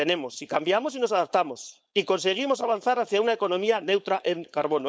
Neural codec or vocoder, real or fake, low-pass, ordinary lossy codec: codec, 16 kHz, 4.8 kbps, FACodec; fake; none; none